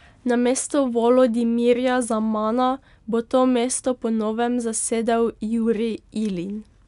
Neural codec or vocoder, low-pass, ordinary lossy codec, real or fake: none; 10.8 kHz; none; real